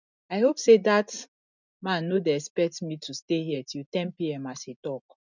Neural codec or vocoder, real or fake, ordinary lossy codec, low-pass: none; real; none; 7.2 kHz